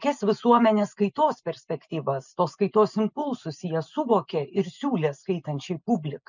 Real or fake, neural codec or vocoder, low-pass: real; none; 7.2 kHz